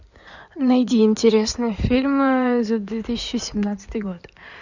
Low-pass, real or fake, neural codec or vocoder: 7.2 kHz; fake; codec, 16 kHz in and 24 kHz out, 2.2 kbps, FireRedTTS-2 codec